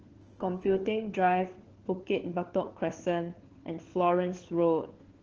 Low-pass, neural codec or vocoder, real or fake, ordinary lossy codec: 7.2 kHz; codec, 16 kHz, 4 kbps, FunCodec, trained on LibriTTS, 50 frames a second; fake; Opus, 16 kbps